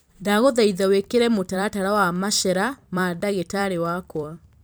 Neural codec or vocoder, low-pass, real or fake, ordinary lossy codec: vocoder, 44.1 kHz, 128 mel bands every 256 samples, BigVGAN v2; none; fake; none